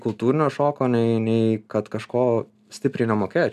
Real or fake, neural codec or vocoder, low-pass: real; none; 14.4 kHz